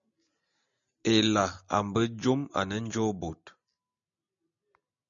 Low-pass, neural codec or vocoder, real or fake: 7.2 kHz; none; real